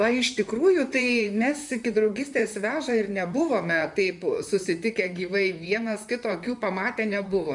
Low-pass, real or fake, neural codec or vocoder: 10.8 kHz; fake; vocoder, 44.1 kHz, 128 mel bands, Pupu-Vocoder